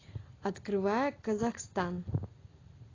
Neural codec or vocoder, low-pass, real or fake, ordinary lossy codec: none; 7.2 kHz; real; AAC, 32 kbps